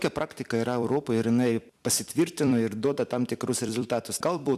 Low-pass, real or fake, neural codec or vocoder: 14.4 kHz; fake; vocoder, 44.1 kHz, 128 mel bands every 256 samples, BigVGAN v2